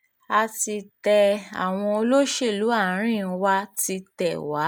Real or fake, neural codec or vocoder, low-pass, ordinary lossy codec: real; none; none; none